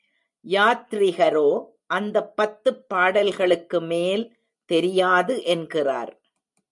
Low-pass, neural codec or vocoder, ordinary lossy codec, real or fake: 10.8 kHz; none; MP3, 96 kbps; real